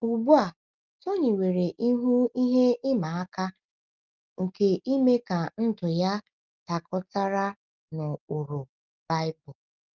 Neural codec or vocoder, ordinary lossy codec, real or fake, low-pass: none; Opus, 32 kbps; real; 7.2 kHz